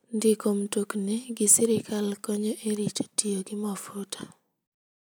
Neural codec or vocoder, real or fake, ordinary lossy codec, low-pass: none; real; none; none